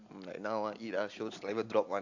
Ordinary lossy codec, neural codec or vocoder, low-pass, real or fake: AAC, 48 kbps; none; 7.2 kHz; real